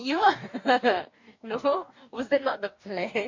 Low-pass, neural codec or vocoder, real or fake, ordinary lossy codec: 7.2 kHz; codec, 44.1 kHz, 2.6 kbps, DAC; fake; MP3, 48 kbps